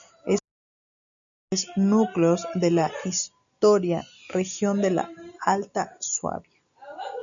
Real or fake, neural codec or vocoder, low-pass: real; none; 7.2 kHz